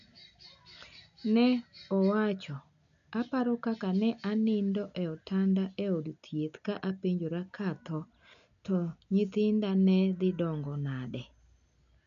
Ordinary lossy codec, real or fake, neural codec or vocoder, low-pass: none; real; none; 7.2 kHz